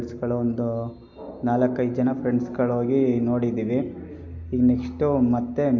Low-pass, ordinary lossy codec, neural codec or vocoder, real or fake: 7.2 kHz; MP3, 64 kbps; none; real